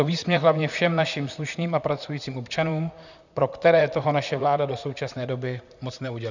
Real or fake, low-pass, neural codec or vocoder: fake; 7.2 kHz; vocoder, 44.1 kHz, 128 mel bands, Pupu-Vocoder